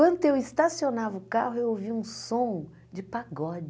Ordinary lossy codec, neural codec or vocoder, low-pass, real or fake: none; none; none; real